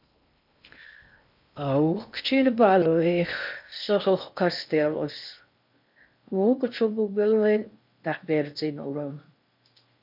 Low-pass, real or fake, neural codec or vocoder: 5.4 kHz; fake; codec, 16 kHz in and 24 kHz out, 0.6 kbps, FocalCodec, streaming, 2048 codes